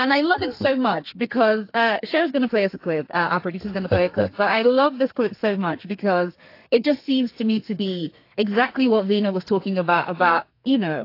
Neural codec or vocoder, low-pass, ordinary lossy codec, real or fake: codec, 32 kHz, 1.9 kbps, SNAC; 5.4 kHz; AAC, 32 kbps; fake